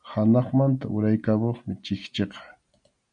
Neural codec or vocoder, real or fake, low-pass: none; real; 9.9 kHz